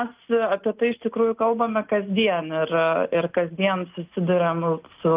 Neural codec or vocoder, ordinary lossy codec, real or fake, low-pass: none; Opus, 32 kbps; real; 3.6 kHz